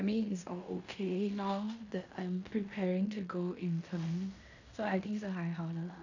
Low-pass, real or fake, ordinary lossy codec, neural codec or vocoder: 7.2 kHz; fake; none; codec, 16 kHz in and 24 kHz out, 0.9 kbps, LongCat-Audio-Codec, fine tuned four codebook decoder